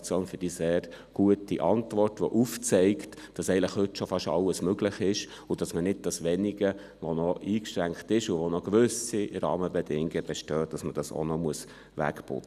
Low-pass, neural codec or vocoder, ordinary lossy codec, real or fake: 14.4 kHz; none; none; real